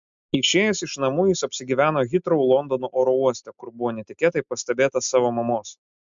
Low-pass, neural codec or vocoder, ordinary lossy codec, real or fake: 7.2 kHz; none; MP3, 64 kbps; real